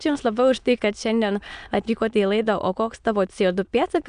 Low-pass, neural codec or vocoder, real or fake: 9.9 kHz; autoencoder, 22.05 kHz, a latent of 192 numbers a frame, VITS, trained on many speakers; fake